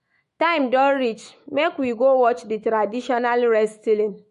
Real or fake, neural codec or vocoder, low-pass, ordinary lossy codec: fake; autoencoder, 48 kHz, 128 numbers a frame, DAC-VAE, trained on Japanese speech; 14.4 kHz; MP3, 48 kbps